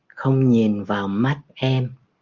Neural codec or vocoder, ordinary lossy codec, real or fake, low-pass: none; Opus, 32 kbps; real; 7.2 kHz